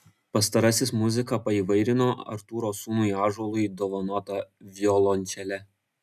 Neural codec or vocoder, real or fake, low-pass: none; real; 14.4 kHz